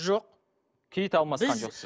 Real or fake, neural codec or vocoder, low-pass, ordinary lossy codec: real; none; none; none